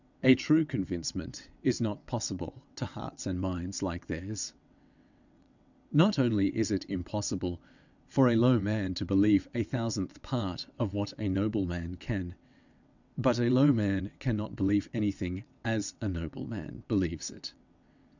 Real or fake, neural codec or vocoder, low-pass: fake; vocoder, 22.05 kHz, 80 mel bands, WaveNeXt; 7.2 kHz